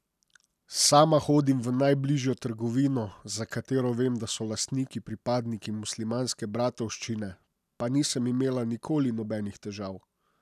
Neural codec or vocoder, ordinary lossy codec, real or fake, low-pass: none; none; real; 14.4 kHz